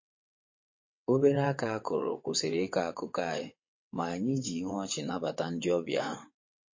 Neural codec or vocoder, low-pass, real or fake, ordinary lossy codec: vocoder, 22.05 kHz, 80 mel bands, WaveNeXt; 7.2 kHz; fake; MP3, 32 kbps